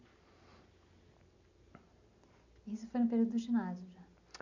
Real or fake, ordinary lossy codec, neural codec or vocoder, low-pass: real; none; none; 7.2 kHz